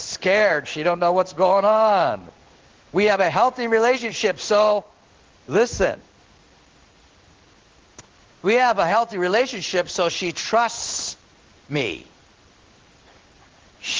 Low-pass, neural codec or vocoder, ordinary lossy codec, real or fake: 7.2 kHz; codec, 16 kHz in and 24 kHz out, 1 kbps, XY-Tokenizer; Opus, 16 kbps; fake